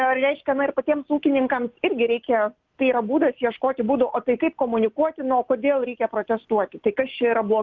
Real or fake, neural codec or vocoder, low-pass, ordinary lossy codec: real; none; 7.2 kHz; Opus, 32 kbps